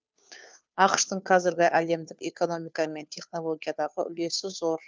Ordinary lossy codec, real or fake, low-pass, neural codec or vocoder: none; fake; none; codec, 16 kHz, 2 kbps, FunCodec, trained on Chinese and English, 25 frames a second